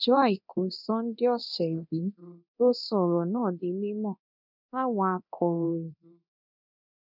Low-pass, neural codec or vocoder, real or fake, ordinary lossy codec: 5.4 kHz; codec, 24 kHz, 0.9 kbps, DualCodec; fake; none